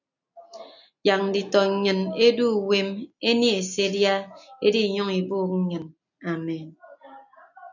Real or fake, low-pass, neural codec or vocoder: real; 7.2 kHz; none